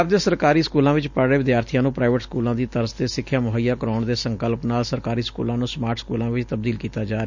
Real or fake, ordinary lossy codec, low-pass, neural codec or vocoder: real; none; 7.2 kHz; none